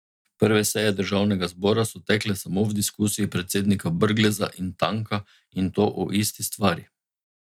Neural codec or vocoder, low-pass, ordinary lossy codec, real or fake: none; 19.8 kHz; none; real